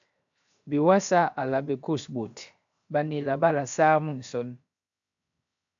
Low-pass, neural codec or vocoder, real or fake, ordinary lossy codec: 7.2 kHz; codec, 16 kHz, 0.7 kbps, FocalCodec; fake; AAC, 64 kbps